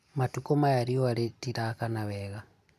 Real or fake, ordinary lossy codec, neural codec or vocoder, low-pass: real; none; none; 14.4 kHz